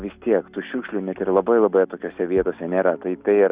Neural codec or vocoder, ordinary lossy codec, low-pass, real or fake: none; Opus, 64 kbps; 3.6 kHz; real